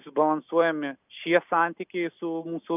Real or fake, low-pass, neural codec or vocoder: real; 3.6 kHz; none